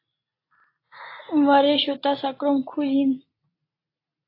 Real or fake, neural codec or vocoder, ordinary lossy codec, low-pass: real; none; AAC, 24 kbps; 5.4 kHz